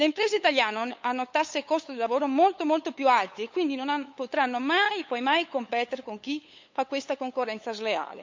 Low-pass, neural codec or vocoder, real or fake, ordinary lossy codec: 7.2 kHz; codec, 16 kHz, 8 kbps, FunCodec, trained on LibriTTS, 25 frames a second; fake; MP3, 64 kbps